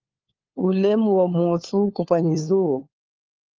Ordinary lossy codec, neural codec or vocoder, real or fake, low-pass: Opus, 32 kbps; codec, 16 kHz, 4 kbps, FunCodec, trained on LibriTTS, 50 frames a second; fake; 7.2 kHz